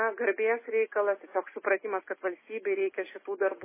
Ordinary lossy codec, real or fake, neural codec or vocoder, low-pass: MP3, 16 kbps; real; none; 3.6 kHz